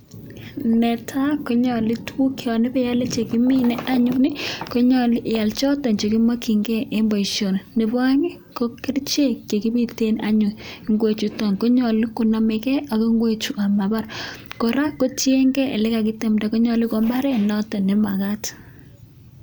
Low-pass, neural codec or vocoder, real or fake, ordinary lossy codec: none; none; real; none